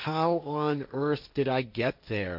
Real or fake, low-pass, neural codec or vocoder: fake; 5.4 kHz; codec, 16 kHz, 1.1 kbps, Voila-Tokenizer